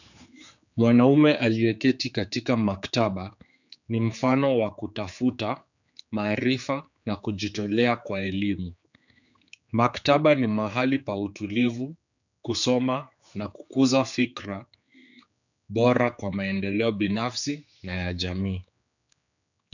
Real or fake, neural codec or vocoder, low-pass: fake; codec, 16 kHz, 4 kbps, X-Codec, HuBERT features, trained on general audio; 7.2 kHz